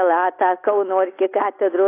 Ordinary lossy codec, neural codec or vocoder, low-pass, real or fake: AAC, 24 kbps; none; 3.6 kHz; real